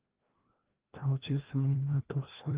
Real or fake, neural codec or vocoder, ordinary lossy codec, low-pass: fake; codec, 16 kHz, 1 kbps, FreqCodec, larger model; Opus, 16 kbps; 3.6 kHz